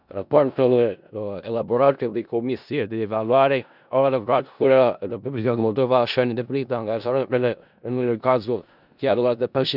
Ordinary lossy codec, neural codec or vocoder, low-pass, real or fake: none; codec, 16 kHz in and 24 kHz out, 0.4 kbps, LongCat-Audio-Codec, four codebook decoder; 5.4 kHz; fake